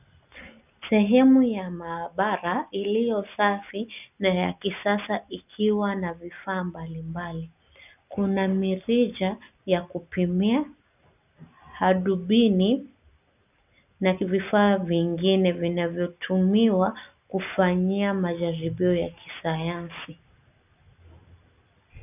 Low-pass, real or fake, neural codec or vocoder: 3.6 kHz; real; none